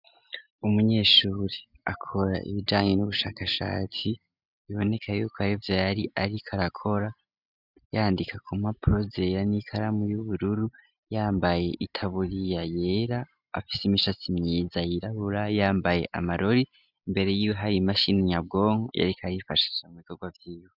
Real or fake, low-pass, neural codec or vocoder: real; 5.4 kHz; none